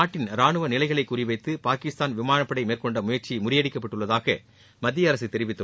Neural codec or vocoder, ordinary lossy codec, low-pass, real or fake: none; none; none; real